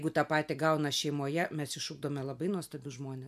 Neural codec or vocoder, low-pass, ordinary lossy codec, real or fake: none; 14.4 kHz; MP3, 96 kbps; real